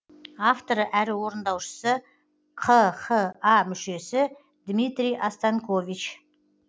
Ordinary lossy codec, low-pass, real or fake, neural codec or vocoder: none; none; real; none